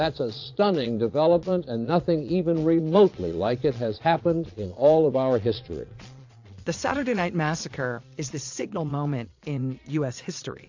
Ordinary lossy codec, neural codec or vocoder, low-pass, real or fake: AAC, 48 kbps; vocoder, 22.05 kHz, 80 mel bands, Vocos; 7.2 kHz; fake